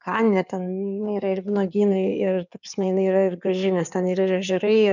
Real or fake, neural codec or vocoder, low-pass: fake; codec, 16 kHz in and 24 kHz out, 2.2 kbps, FireRedTTS-2 codec; 7.2 kHz